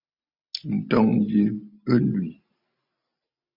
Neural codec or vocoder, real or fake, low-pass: none; real; 5.4 kHz